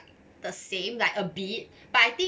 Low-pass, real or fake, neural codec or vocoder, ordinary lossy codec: none; real; none; none